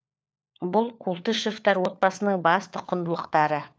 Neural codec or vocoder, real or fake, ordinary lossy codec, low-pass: codec, 16 kHz, 4 kbps, FunCodec, trained on LibriTTS, 50 frames a second; fake; none; none